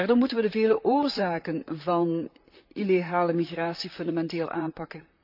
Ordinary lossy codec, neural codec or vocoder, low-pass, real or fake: none; vocoder, 44.1 kHz, 128 mel bands, Pupu-Vocoder; 5.4 kHz; fake